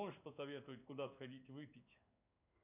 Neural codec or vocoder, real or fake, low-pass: codec, 16 kHz in and 24 kHz out, 1 kbps, XY-Tokenizer; fake; 3.6 kHz